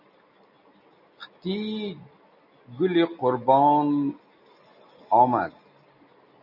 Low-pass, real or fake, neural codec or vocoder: 5.4 kHz; real; none